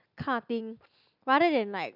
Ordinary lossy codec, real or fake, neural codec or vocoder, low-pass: none; real; none; 5.4 kHz